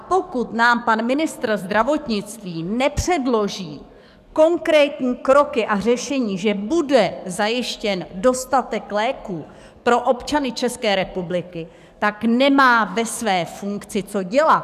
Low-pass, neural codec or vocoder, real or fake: 14.4 kHz; codec, 44.1 kHz, 7.8 kbps, DAC; fake